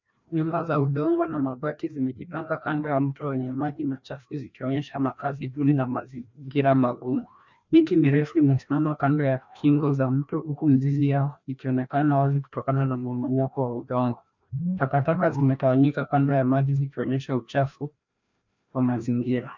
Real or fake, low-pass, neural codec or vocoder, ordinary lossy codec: fake; 7.2 kHz; codec, 16 kHz, 1 kbps, FreqCodec, larger model; MP3, 64 kbps